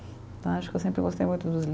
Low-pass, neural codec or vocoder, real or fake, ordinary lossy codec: none; none; real; none